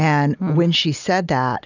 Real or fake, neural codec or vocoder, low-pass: real; none; 7.2 kHz